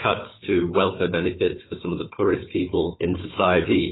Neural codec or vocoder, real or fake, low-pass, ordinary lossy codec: codec, 16 kHz, 4 kbps, FunCodec, trained on Chinese and English, 50 frames a second; fake; 7.2 kHz; AAC, 16 kbps